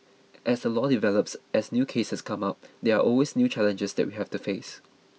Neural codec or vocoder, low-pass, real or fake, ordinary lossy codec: none; none; real; none